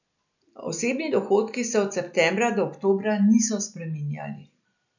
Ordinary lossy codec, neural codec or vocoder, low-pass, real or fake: none; none; 7.2 kHz; real